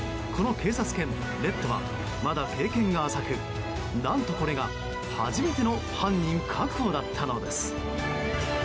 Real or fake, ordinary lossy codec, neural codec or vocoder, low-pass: real; none; none; none